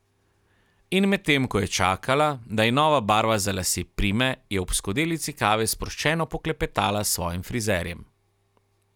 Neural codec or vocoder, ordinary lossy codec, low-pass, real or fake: none; none; 19.8 kHz; real